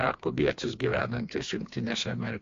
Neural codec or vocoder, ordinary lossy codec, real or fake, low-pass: codec, 16 kHz, 2 kbps, FreqCodec, smaller model; AAC, 48 kbps; fake; 7.2 kHz